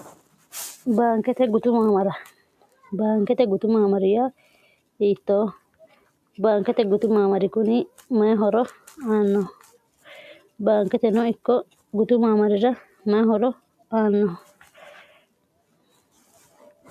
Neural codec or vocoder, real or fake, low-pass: none; real; 14.4 kHz